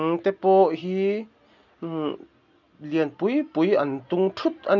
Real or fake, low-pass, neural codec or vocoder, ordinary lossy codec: real; 7.2 kHz; none; none